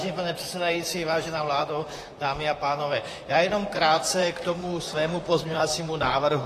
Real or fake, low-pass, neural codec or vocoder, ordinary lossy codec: fake; 14.4 kHz; vocoder, 44.1 kHz, 128 mel bands, Pupu-Vocoder; AAC, 48 kbps